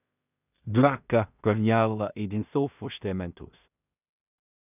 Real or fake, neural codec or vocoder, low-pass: fake; codec, 16 kHz in and 24 kHz out, 0.4 kbps, LongCat-Audio-Codec, two codebook decoder; 3.6 kHz